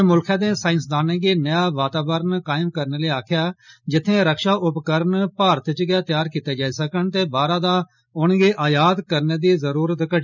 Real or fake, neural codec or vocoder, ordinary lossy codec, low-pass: real; none; none; 7.2 kHz